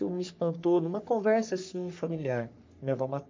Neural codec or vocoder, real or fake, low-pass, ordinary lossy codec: codec, 44.1 kHz, 3.4 kbps, Pupu-Codec; fake; 7.2 kHz; MP3, 64 kbps